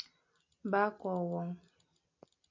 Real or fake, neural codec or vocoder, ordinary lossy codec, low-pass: real; none; MP3, 48 kbps; 7.2 kHz